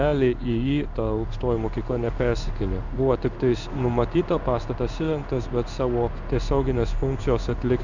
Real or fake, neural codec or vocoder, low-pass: fake; codec, 16 kHz in and 24 kHz out, 1 kbps, XY-Tokenizer; 7.2 kHz